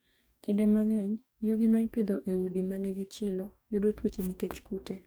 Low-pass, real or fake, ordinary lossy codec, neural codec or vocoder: none; fake; none; codec, 44.1 kHz, 2.6 kbps, DAC